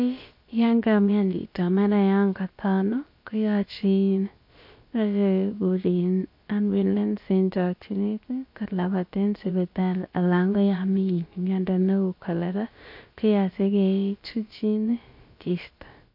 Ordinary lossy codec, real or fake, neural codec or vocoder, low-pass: MP3, 32 kbps; fake; codec, 16 kHz, about 1 kbps, DyCAST, with the encoder's durations; 5.4 kHz